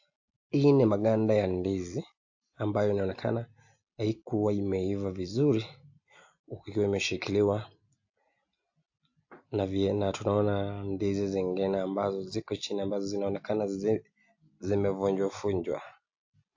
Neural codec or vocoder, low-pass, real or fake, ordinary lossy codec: none; 7.2 kHz; real; AAC, 48 kbps